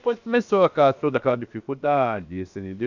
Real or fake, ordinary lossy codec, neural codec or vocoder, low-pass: fake; none; codec, 16 kHz, about 1 kbps, DyCAST, with the encoder's durations; 7.2 kHz